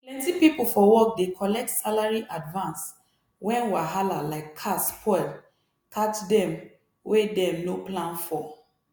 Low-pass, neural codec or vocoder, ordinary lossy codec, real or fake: none; none; none; real